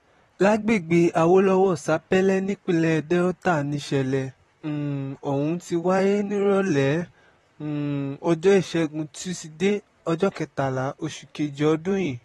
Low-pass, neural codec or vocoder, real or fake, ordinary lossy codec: 19.8 kHz; vocoder, 44.1 kHz, 128 mel bands, Pupu-Vocoder; fake; AAC, 32 kbps